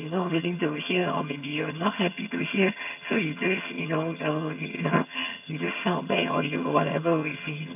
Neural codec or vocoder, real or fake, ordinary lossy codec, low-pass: vocoder, 22.05 kHz, 80 mel bands, HiFi-GAN; fake; none; 3.6 kHz